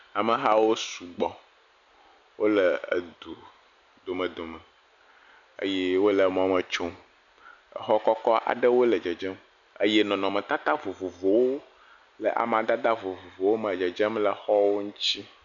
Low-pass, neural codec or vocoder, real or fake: 7.2 kHz; none; real